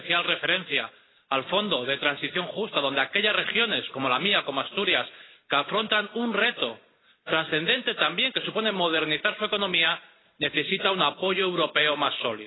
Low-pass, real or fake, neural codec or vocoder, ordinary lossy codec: 7.2 kHz; real; none; AAC, 16 kbps